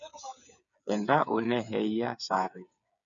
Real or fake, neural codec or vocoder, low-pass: fake; codec, 16 kHz, 8 kbps, FreqCodec, smaller model; 7.2 kHz